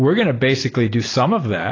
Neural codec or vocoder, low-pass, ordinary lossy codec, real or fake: none; 7.2 kHz; AAC, 32 kbps; real